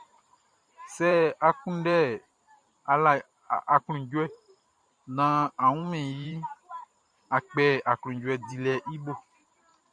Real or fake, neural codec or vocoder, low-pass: fake; vocoder, 44.1 kHz, 128 mel bands every 512 samples, BigVGAN v2; 9.9 kHz